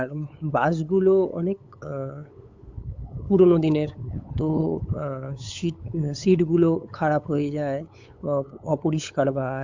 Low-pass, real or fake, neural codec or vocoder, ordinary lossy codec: 7.2 kHz; fake; codec, 16 kHz, 8 kbps, FunCodec, trained on LibriTTS, 25 frames a second; MP3, 64 kbps